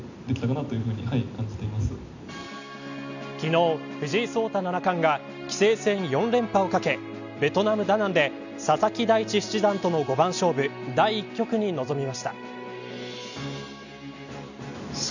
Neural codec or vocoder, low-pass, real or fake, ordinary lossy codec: none; 7.2 kHz; real; none